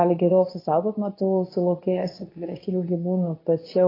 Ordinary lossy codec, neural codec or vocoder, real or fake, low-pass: AAC, 24 kbps; codec, 24 kHz, 0.9 kbps, WavTokenizer, medium speech release version 2; fake; 5.4 kHz